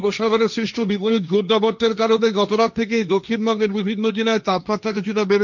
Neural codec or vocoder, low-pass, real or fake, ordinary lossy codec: codec, 16 kHz, 1.1 kbps, Voila-Tokenizer; 7.2 kHz; fake; none